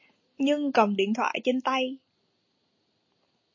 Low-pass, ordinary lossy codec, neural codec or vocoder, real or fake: 7.2 kHz; MP3, 32 kbps; none; real